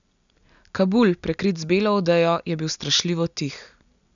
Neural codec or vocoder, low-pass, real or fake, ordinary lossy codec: none; 7.2 kHz; real; none